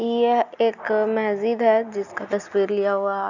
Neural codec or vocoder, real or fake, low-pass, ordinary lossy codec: none; real; 7.2 kHz; none